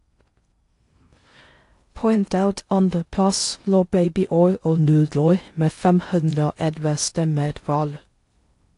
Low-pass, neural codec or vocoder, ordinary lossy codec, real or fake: 10.8 kHz; codec, 16 kHz in and 24 kHz out, 0.6 kbps, FocalCodec, streaming, 4096 codes; AAC, 48 kbps; fake